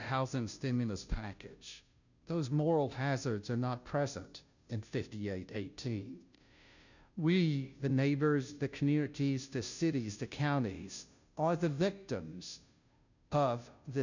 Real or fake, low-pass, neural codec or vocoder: fake; 7.2 kHz; codec, 16 kHz, 0.5 kbps, FunCodec, trained on Chinese and English, 25 frames a second